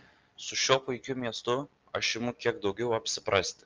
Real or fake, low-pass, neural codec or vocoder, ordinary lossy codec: real; 7.2 kHz; none; Opus, 32 kbps